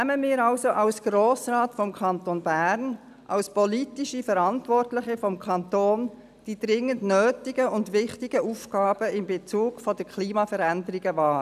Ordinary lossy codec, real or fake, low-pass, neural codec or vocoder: none; real; 14.4 kHz; none